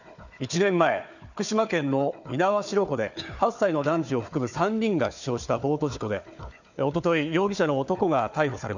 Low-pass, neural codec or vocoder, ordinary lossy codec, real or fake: 7.2 kHz; codec, 16 kHz, 4 kbps, FunCodec, trained on Chinese and English, 50 frames a second; none; fake